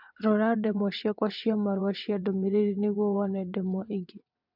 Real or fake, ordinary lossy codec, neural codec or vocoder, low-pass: fake; MP3, 48 kbps; vocoder, 22.05 kHz, 80 mel bands, WaveNeXt; 5.4 kHz